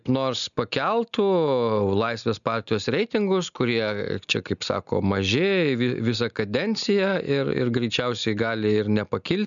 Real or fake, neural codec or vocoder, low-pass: real; none; 7.2 kHz